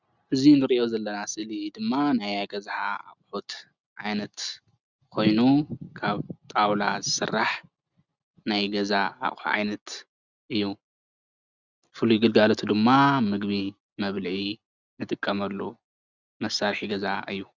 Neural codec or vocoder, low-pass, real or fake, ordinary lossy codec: none; 7.2 kHz; real; Opus, 64 kbps